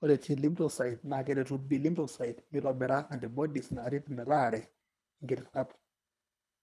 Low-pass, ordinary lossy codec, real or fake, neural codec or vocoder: none; none; fake; codec, 24 kHz, 3 kbps, HILCodec